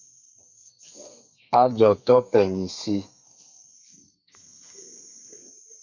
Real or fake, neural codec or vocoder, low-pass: fake; codec, 32 kHz, 1.9 kbps, SNAC; 7.2 kHz